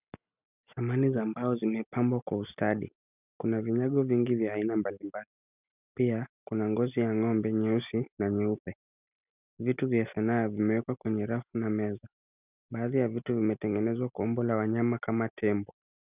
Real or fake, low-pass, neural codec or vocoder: real; 3.6 kHz; none